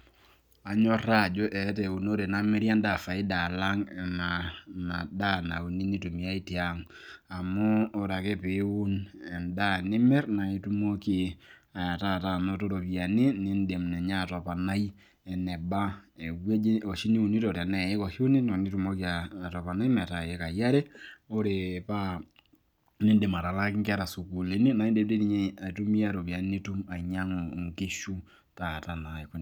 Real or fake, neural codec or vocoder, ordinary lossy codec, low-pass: real; none; none; 19.8 kHz